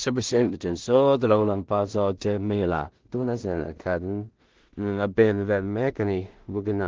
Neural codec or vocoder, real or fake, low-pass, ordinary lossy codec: codec, 16 kHz in and 24 kHz out, 0.4 kbps, LongCat-Audio-Codec, two codebook decoder; fake; 7.2 kHz; Opus, 16 kbps